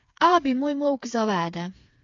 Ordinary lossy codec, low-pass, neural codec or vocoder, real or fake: AAC, 48 kbps; 7.2 kHz; codec, 16 kHz, 4 kbps, FreqCodec, smaller model; fake